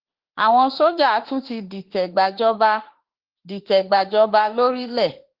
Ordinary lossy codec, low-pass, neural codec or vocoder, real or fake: Opus, 16 kbps; 5.4 kHz; autoencoder, 48 kHz, 32 numbers a frame, DAC-VAE, trained on Japanese speech; fake